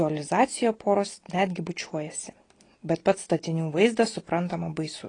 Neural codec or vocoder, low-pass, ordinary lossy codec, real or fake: none; 9.9 kHz; AAC, 32 kbps; real